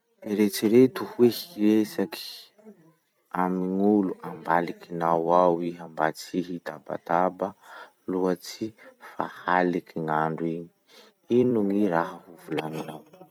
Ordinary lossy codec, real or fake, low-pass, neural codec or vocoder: none; fake; 19.8 kHz; vocoder, 44.1 kHz, 128 mel bands every 256 samples, BigVGAN v2